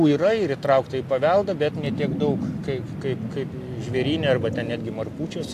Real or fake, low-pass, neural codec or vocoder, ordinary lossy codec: real; 14.4 kHz; none; AAC, 64 kbps